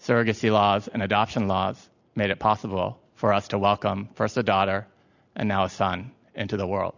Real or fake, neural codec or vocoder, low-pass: real; none; 7.2 kHz